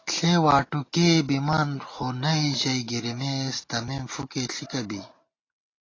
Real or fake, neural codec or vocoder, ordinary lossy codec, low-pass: real; none; AAC, 32 kbps; 7.2 kHz